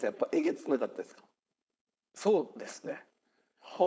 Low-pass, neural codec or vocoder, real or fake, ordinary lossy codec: none; codec, 16 kHz, 4.8 kbps, FACodec; fake; none